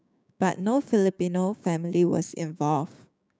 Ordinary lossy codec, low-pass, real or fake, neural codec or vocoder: none; none; fake; codec, 16 kHz, 6 kbps, DAC